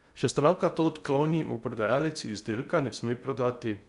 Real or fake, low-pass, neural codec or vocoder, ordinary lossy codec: fake; 10.8 kHz; codec, 16 kHz in and 24 kHz out, 0.6 kbps, FocalCodec, streaming, 4096 codes; none